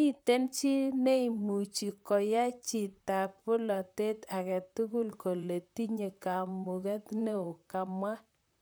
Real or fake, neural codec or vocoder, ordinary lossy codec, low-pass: fake; vocoder, 44.1 kHz, 128 mel bands, Pupu-Vocoder; none; none